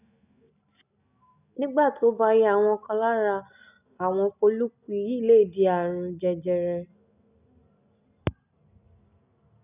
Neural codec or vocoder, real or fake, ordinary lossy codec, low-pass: none; real; none; 3.6 kHz